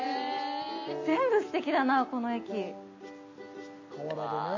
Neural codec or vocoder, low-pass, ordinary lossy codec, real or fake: none; 7.2 kHz; MP3, 32 kbps; real